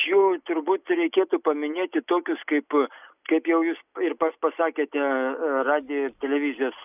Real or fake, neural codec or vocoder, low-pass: real; none; 3.6 kHz